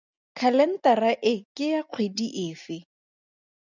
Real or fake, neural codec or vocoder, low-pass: real; none; 7.2 kHz